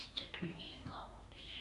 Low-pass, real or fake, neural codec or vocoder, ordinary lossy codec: 10.8 kHz; fake; codec, 24 kHz, 1 kbps, SNAC; none